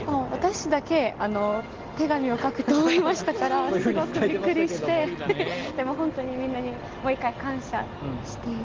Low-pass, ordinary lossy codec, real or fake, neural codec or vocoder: 7.2 kHz; Opus, 16 kbps; real; none